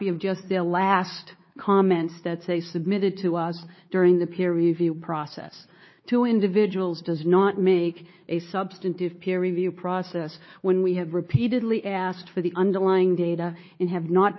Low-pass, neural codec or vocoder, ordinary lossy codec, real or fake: 7.2 kHz; codec, 16 kHz, 4 kbps, X-Codec, WavLM features, trained on Multilingual LibriSpeech; MP3, 24 kbps; fake